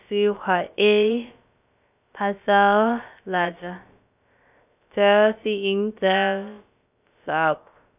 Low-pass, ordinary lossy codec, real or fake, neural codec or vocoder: 3.6 kHz; none; fake; codec, 16 kHz, about 1 kbps, DyCAST, with the encoder's durations